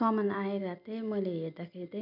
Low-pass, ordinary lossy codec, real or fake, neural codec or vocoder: 5.4 kHz; none; real; none